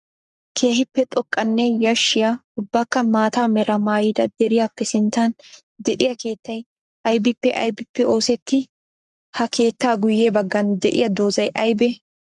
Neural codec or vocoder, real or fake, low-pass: codec, 44.1 kHz, 7.8 kbps, Pupu-Codec; fake; 10.8 kHz